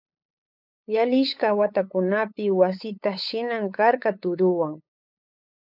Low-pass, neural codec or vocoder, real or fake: 5.4 kHz; codec, 16 kHz, 8 kbps, FunCodec, trained on LibriTTS, 25 frames a second; fake